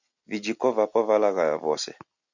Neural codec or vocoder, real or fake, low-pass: none; real; 7.2 kHz